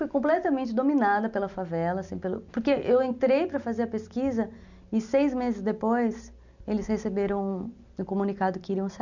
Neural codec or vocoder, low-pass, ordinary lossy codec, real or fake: none; 7.2 kHz; none; real